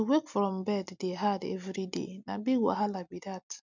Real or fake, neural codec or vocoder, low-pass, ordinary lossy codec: real; none; 7.2 kHz; none